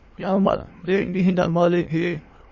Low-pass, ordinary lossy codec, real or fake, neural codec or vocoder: 7.2 kHz; MP3, 32 kbps; fake; autoencoder, 22.05 kHz, a latent of 192 numbers a frame, VITS, trained on many speakers